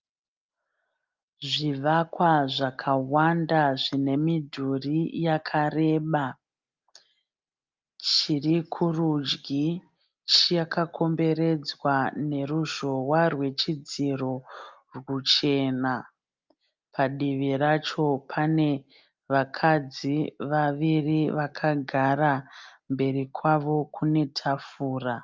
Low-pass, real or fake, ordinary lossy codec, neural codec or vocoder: 7.2 kHz; real; Opus, 24 kbps; none